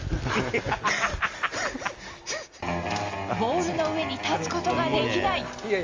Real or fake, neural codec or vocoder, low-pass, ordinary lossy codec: real; none; 7.2 kHz; Opus, 32 kbps